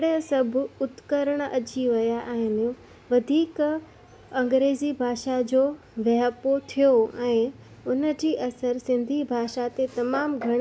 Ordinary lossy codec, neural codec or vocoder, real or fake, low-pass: none; none; real; none